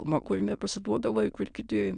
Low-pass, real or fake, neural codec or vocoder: 9.9 kHz; fake; autoencoder, 22.05 kHz, a latent of 192 numbers a frame, VITS, trained on many speakers